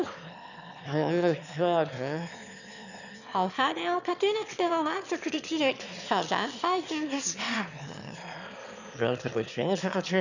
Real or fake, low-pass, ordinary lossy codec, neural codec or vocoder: fake; 7.2 kHz; none; autoencoder, 22.05 kHz, a latent of 192 numbers a frame, VITS, trained on one speaker